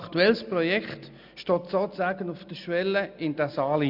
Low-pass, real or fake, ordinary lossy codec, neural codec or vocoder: 5.4 kHz; real; none; none